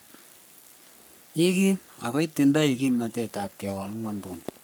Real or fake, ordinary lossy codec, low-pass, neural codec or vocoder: fake; none; none; codec, 44.1 kHz, 3.4 kbps, Pupu-Codec